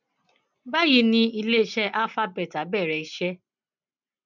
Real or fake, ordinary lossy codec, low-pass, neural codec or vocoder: real; none; 7.2 kHz; none